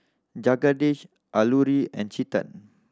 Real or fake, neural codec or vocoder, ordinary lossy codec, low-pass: real; none; none; none